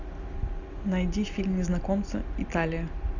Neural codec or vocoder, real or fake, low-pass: none; real; 7.2 kHz